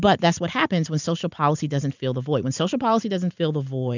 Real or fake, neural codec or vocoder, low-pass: real; none; 7.2 kHz